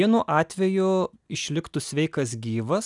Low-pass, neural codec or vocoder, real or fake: 10.8 kHz; none; real